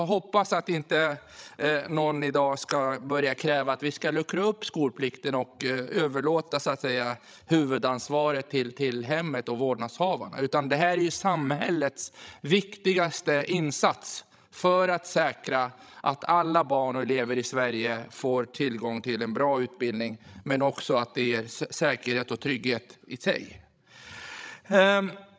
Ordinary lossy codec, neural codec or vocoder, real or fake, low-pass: none; codec, 16 kHz, 16 kbps, FreqCodec, larger model; fake; none